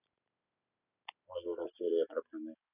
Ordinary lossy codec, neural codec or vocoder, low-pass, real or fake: none; none; 3.6 kHz; real